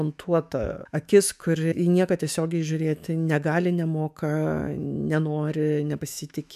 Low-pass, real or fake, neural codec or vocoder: 14.4 kHz; fake; autoencoder, 48 kHz, 128 numbers a frame, DAC-VAE, trained on Japanese speech